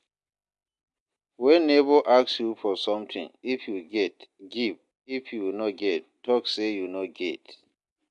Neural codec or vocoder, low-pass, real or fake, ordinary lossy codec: none; 10.8 kHz; real; MP3, 64 kbps